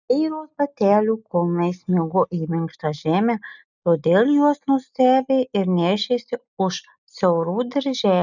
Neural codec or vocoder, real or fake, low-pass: none; real; 7.2 kHz